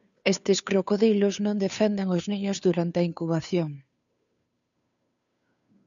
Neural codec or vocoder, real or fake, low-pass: codec, 16 kHz, 2 kbps, FunCodec, trained on Chinese and English, 25 frames a second; fake; 7.2 kHz